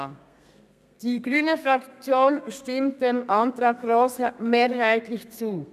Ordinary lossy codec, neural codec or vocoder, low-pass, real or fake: none; codec, 32 kHz, 1.9 kbps, SNAC; 14.4 kHz; fake